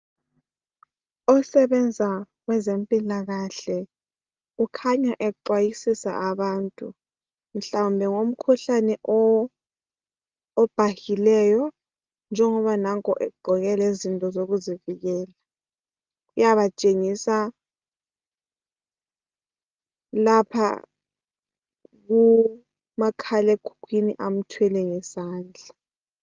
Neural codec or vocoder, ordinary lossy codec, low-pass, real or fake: none; Opus, 32 kbps; 7.2 kHz; real